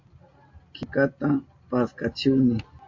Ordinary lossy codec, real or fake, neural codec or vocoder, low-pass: MP3, 48 kbps; real; none; 7.2 kHz